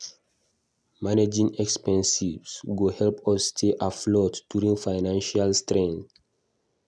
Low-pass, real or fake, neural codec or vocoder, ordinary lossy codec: none; real; none; none